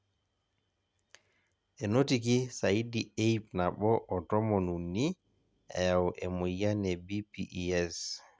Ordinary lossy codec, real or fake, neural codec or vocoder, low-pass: none; real; none; none